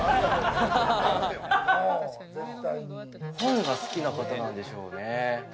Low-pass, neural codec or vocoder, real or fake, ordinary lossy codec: none; none; real; none